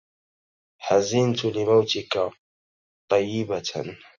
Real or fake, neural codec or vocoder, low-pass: real; none; 7.2 kHz